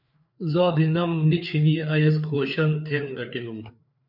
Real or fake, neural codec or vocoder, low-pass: fake; codec, 16 kHz, 4 kbps, FreqCodec, larger model; 5.4 kHz